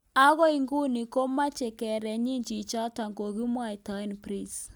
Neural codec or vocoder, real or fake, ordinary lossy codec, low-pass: none; real; none; none